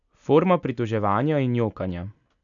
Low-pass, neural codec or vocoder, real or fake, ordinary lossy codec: 7.2 kHz; none; real; none